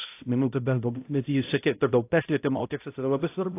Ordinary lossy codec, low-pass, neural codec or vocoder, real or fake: AAC, 24 kbps; 3.6 kHz; codec, 16 kHz, 0.5 kbps, X-Codec, HuBERT features, trained on balanced general audio; fake